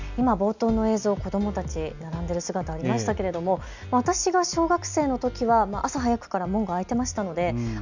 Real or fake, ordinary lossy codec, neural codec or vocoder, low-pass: real; none; none; 7.2 kHz